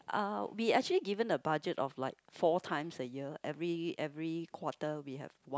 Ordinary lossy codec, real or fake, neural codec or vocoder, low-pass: none; real; none; none